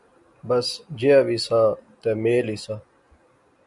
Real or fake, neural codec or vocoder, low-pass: real; none; 10.8 kHz